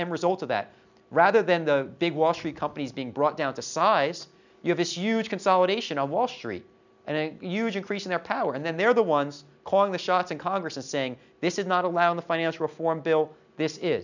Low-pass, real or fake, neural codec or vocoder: 7.2 kHz; real; none